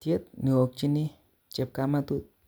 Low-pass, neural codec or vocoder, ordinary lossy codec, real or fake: none; none; none; real